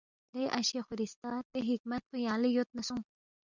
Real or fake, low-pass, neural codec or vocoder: real; 7.2 kHz; none